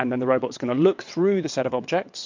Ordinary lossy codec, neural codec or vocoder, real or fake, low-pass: MP3, 48 kbps; vocoder, 22.05 kHz, 80 mel bands, WaveNeXt; fake; 7.2 kHz